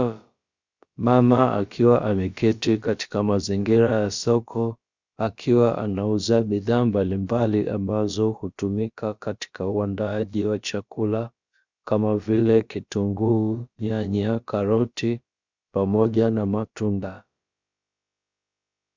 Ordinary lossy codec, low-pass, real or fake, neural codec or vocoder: Opus, 64 kbps; 7.2 kHz; fake; codec, 16 kHz, about 1 kbps, DyCAST, with the encoder's durations